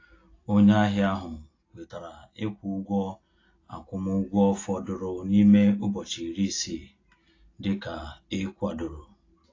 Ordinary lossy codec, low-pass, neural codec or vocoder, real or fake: AAC, 32 kbps; 7.2 kHz; none; real